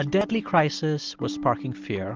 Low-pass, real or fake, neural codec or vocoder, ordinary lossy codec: 7.2 kHz; real; none; Opus, 24 kbps